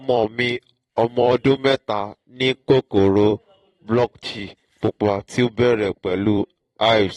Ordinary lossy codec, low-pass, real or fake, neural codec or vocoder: AAC, 32 kbps; 19.8 kHz; fake; vocoder, 44.1 kHz, 128 mel bands every 256 samples, BigVGAN v2